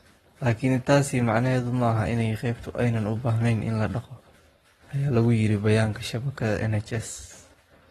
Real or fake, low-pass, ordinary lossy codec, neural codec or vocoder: fake; 19.8 kHz; AAC, 32 kbps; codec, 44.1 kHz, 7.8 kbps, Pupu-Codec